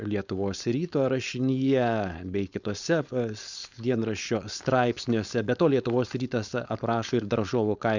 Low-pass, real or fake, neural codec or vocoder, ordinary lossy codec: 7.2 kHz; fake; codec, 16 kHz, 4.8 kbps, FACodec; Opus, 64 kbps